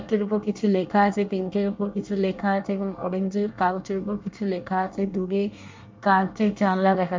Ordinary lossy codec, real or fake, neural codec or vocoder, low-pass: MP3, 64 kbps; fake; codec, 24 kHz, 1 kbps, SNAC; 7.2 kHz